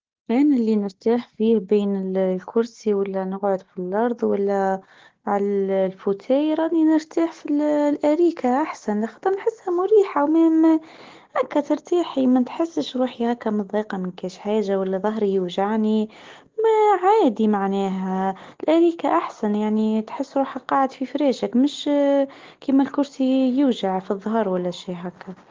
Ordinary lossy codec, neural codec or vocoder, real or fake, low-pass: Opus, 16 kbps; none; real; 7.2 kHz